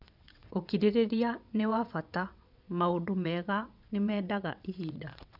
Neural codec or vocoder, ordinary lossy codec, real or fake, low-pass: vocoder, 22.05 kHz, 80 mel bands, WaveNeXt; none; fake; 5.4 kHz